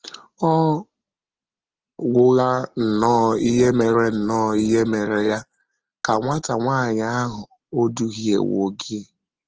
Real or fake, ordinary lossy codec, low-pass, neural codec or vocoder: real; Opus, 16 kbps; 7.2 kHz; none